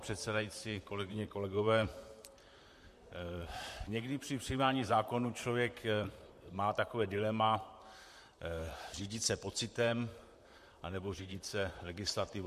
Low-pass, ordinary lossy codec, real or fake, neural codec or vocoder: 14.4 kHz; MP3, 64 kbps; fake; vocoder, 44.1 kHz, 128 mel bands, Pupu-Vocoder